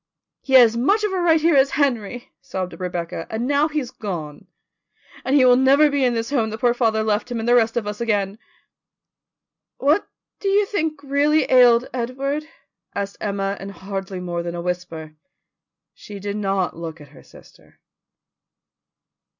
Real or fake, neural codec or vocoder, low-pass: real; none; 7.2 kHz